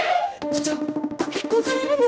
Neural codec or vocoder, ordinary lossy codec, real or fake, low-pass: codec, 16 kHz, 1 kbps, X-Codec, HuBERT features, trained on general audio; none; fake; none